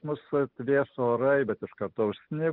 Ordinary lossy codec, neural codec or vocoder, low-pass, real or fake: Opus, 32 kbps; none; 5.4 kHz; real